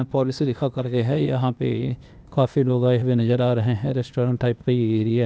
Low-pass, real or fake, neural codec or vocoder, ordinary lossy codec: none; fake; codec, 16 kHz, 0.8 kbps, ZipCodec; none